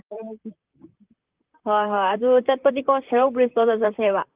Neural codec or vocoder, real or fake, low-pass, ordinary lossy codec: vocoder, 44.1 kHz, 128 mel bands, Pupu-Vocoder; fake; 3.6 kHz; Opus, 32 kbps